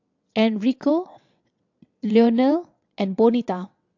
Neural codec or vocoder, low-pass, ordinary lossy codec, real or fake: vocoder, 22.05 kHz, 80 mel bands, WaveNeXt; 7.2 kHz; AAC, 48 kbps; fake